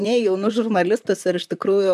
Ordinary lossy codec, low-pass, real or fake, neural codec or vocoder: MP3, 96 kbps; 14.4 kHz; fake; vocoder, 44.1 kHz, 128 mel bands, Pupu-Vocoder